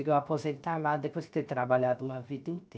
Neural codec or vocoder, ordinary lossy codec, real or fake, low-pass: codec, 16 kHz, 0.7 kbps, FocalCodec; none; fake; none